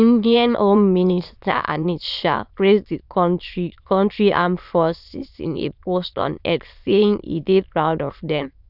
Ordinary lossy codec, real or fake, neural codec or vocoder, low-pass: none; fake; autoencoder, 22.05 kHz, a latent of 192 numbers a frame, VITS, trained on many speakers; 5.4 kHz